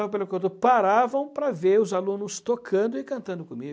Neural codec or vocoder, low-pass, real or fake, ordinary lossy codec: none; none; real; none